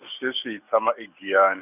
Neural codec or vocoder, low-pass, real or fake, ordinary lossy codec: none; 3.6 kHz; real; none